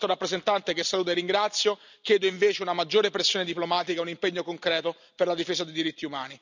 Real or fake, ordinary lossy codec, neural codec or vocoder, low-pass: real; none; none; 7.2 kHz